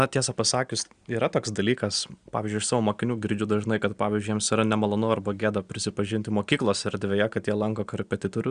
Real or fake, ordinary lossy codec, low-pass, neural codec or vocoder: real; Opus, 64 kbps; 9.9 kHz; none